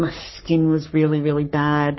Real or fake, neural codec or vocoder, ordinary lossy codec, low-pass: fake; codec, 44.1 kHz, 3.4 kbps, Pupu-Codec; MP3, 24 kbps; 7.2 kHz